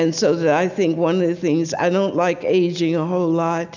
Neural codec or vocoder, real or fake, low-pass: none; real; 7.2 kHz